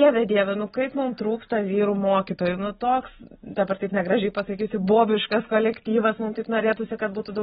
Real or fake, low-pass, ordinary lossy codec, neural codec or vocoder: real; 10.8 kHz; AAC, 16 kbps; none